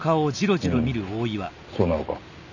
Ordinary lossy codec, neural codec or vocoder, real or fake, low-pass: none; none; real; 7.2 kHz